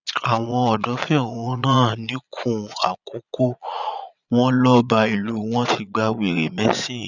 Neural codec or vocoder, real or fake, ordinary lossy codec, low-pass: vocoder, 22.05 kHz, 80 mel bands, Vocos; fake; none; 7.2 kHz